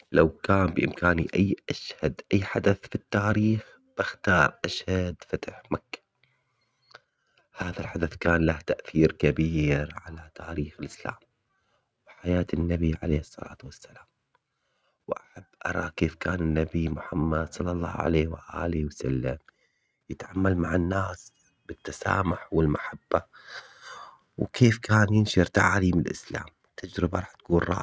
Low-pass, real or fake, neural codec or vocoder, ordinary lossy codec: none; real; none; none